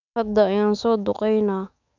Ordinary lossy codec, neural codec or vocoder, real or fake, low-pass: none; autoencoder, 48 kHz, 128 numbers a frame, DAC-VAE, trained on Japanese speech; fake; 7.2 kHz